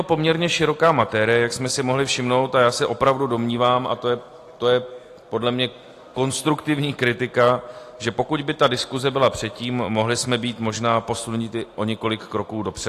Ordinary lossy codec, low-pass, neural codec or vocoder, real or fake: AAC, 48 kbps; 14.4 kHz; none; real